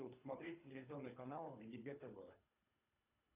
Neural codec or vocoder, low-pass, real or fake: codec, 24 kHz, 3 kbps, HILCodec; 3.6 kHz; fake